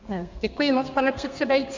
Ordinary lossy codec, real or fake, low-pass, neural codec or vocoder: MP3, 64 kbps; fake; 7.2 kHz; codec, 32 kHz, 1.9 kbps, SNAC